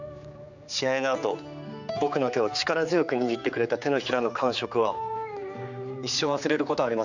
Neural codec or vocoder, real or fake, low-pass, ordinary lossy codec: codec, 16 kHz, 4 kbps, X-Codec, HuBERT features, trained on general audio; fake; 7.2 kHz; none